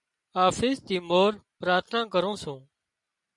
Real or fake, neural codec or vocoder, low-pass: real; none; 10.8 kHz